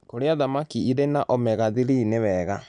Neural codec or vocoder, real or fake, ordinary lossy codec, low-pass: none; real; none; 9.9 kHz